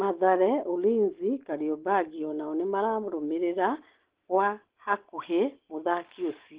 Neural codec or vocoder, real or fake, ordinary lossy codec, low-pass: none; real; Opus, 16 kbps; 3.6 kHz